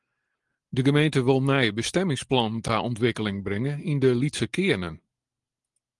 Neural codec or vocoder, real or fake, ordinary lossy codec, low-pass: none; real; Opus, 24 kbps; 10.8 kHz